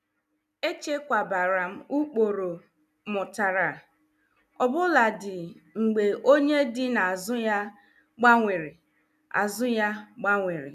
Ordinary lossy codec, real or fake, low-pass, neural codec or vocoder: none; real; 14.4 kHz; none